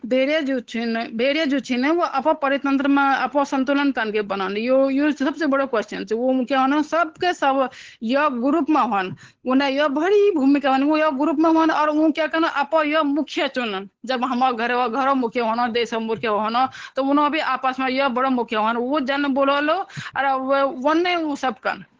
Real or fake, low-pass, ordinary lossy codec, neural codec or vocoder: fake; 7.2 kHz; Opus, 16 kbps; codec, 16 kHz, 8 kbps, FunCodec, trained on Chinese and English, 25 frames a second